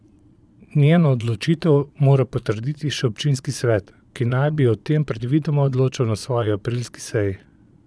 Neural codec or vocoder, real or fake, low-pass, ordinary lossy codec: vocoder, 22.05 kHz, 80 mel bands, Vocos; fake; none; none